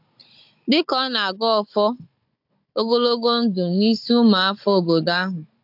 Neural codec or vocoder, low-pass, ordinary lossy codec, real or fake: codec, 16 kHz, 16 kbps, FunCodec, trained on Chinese and English, 50 frames a second; 5.4 kHz; AAC, 48 kbps; fake